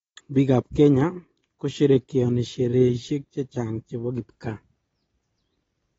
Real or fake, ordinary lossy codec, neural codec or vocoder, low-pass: real; AAC, 24 kbps; none; 19.8 kHz